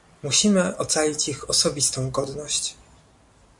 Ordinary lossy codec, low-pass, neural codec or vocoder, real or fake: MP3, 96 kbps; 10.8 kHz; none; real